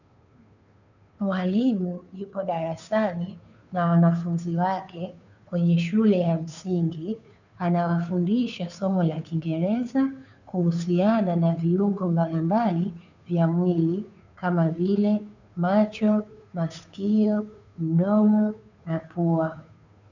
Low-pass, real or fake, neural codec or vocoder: 7.2 kHz; fake; codec, 16 kHz, 2 kbps, FunCodec, trained on Chinese and English, 25 frames a second